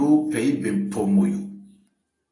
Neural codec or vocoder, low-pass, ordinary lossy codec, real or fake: none; 10.8 kHz; AAC, 32 kbps; real